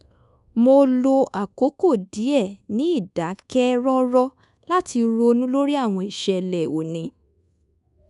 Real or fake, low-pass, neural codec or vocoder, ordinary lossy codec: fake; 10.8 kHz; codec, 24 kHz, 1.2 kbps, DualCodec; none